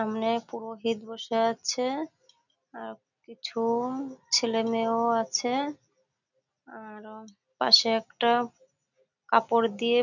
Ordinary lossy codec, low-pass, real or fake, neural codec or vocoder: none; 7.2 kHz; real; none